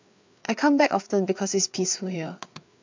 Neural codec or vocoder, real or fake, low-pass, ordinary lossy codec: codec, 16 kHz, 4 kbps, FreqCodec, larger model; fake; 7.2 kHz; MP3, 64 kbps